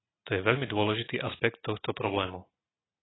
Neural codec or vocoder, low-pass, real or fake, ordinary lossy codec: none; 7.2 kHz; real; AAC, 16 kbps